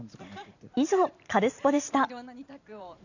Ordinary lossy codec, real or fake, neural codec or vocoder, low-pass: none; fake; vocoder, 44.1 kHz, 128 mel bands every 512 samples, BigVGAN v2; 7.2 kHz